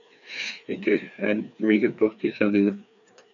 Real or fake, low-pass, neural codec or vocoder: fake; 7.2 kHz; codec, 16 kHz, 2 kbps, FreqCodec, larger model